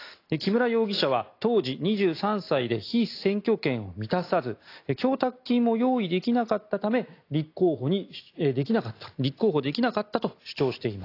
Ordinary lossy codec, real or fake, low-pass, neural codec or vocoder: AAC, 32 kbps; real; 5.4 kHz; none